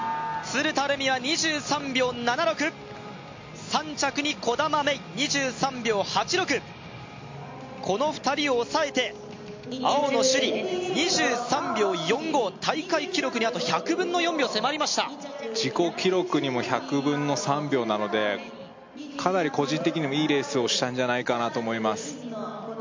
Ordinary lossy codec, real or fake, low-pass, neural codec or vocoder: MP3, 48 kbps; real; 7.2 kHz; none